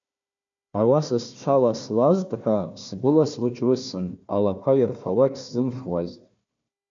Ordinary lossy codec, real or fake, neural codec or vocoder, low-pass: MP3, 64 kbps; fake; codec, 16 kHz, 1 kbps, FunCodec, trained on Chinese and English, 50 frames a second; 7.2 kHz